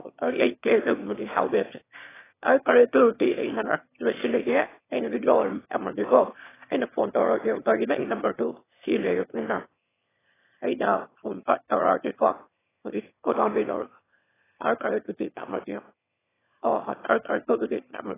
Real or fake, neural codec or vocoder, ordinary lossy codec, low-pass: fake; autoencoder, 22.05 kHz, a latent of 192 numbers a frame, VITS, trained on one speaker; AAC, 16 kbps; 3.6 kHz